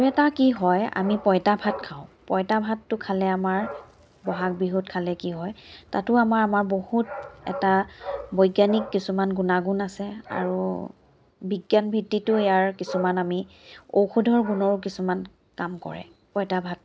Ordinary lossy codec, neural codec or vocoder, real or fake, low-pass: none; none; real; none